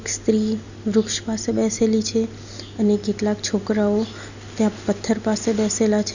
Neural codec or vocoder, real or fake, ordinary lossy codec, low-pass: none; real; none; 7.2 kHz